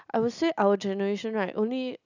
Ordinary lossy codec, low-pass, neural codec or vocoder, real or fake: none; 7.2 kHz; none; real